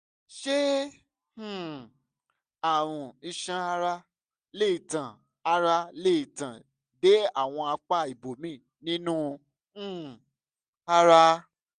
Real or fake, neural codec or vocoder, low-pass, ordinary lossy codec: real; none; 9.9 kHz; Opus, 64 kbps